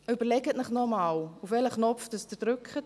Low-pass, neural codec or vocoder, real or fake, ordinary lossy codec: none; none; real; none